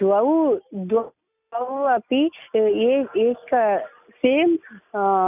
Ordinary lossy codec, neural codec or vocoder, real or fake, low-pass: none; none; real; 3.6 kHz